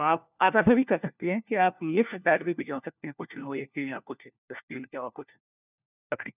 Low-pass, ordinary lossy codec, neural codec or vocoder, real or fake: 3.6 kHz; none; codec, 16 kHz, 1 kbps, FunCodec, trained on LibriTTS, 50 frames a second; fake